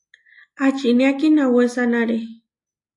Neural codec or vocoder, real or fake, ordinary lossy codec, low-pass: none; real; AAC, 48 kbps; 10.8 kHz